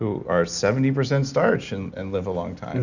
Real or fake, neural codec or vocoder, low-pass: real; none; 7.2 kHz